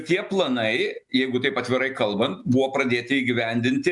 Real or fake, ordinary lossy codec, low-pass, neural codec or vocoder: real; MP3, 96 kbps; 10.8 kHz; none